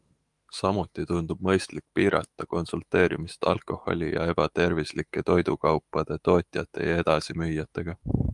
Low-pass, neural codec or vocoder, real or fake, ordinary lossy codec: 10.8 kHz; autoencoder, 48 kHz, 128 numbers a frame, DAC-VAE, trained on Japanese speech; fake; Opus, 32 kbps